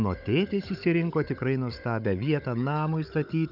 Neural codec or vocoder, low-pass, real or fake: codec, 16 kHz, 16 kbps, FunCodec, trained on Chinese and English, 50 frames a second; 5.4 kHz; fake